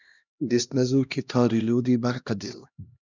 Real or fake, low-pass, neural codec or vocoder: fake; 7.2 kHz; codec, 16 kHz, 1 kbps, X-Codec, HuBERT features, trained on LibriSpeech